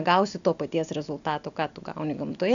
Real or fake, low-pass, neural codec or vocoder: real; 7.2 kHz; none